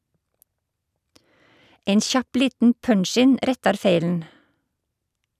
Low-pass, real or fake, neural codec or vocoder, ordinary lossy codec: 14.4 kHz; real; none; none